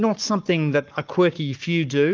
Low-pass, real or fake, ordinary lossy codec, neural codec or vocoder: 7.2 kHz; fake; Opus, 24 kbps; codec, 44.1 kHz, 7.8 kbps, Pupu-Codec